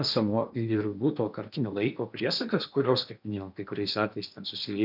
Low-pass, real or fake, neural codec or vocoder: 5.4 kHz; fake; codec, 16 kHz in and 24 kHz out, 0.8 kbps, FocalCodec, streaming, 65536 codes